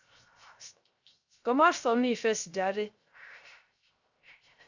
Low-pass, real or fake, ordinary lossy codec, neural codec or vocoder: 7.2 kHz; fake; Opus, 64 kbps; codec, 16 kHz, 0.3 kbps, FocalCodec